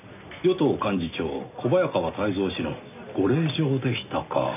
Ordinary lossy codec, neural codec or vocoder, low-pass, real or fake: none; none; 3.6 kHz; real